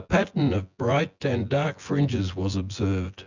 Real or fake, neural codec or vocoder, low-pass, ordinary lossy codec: fake; vocoder, 24 kHz, 100 mel bands, Vocos; 7.2 kHz; Opus, 64 kbps